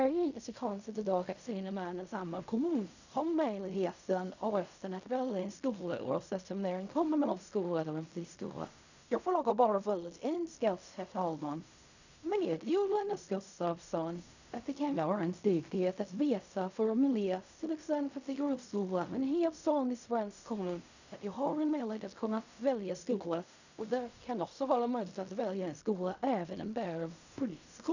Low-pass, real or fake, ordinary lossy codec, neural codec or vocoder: 7.2 kHz; fake; none; codec, 16 kHz in and 24 kHz out, 0.4 kbps, LongCat-Audio-Codec, fine tuned four codebook decoder